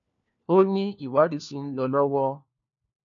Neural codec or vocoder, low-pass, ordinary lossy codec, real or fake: codec, 16 kHz, 1 kbps, FunCodec, trained on LibriTTS, 50 frames a second; 7.2 kHz; none; fake